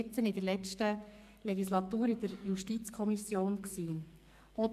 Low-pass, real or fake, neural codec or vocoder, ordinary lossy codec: 14.4 kHz; fake; codec, 44.1 kHz, 2.6 kbps, SNAC; AAC, 96 kbps